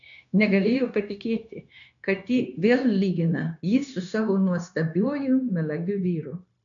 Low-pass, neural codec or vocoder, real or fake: 7.2 kHz; codec, 16 kHz, 0.9 kbps, LongCat-Audio-Codec; fake